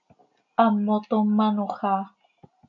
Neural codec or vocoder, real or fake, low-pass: none; real; 7.2 kHz